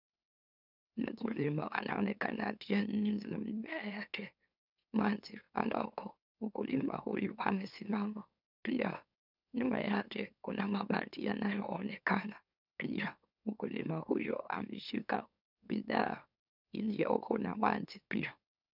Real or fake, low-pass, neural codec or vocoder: fake; 5.4 kHz; autoencoder, 44.1 kHz, a latent of 192 numbers a frame, MeloTTS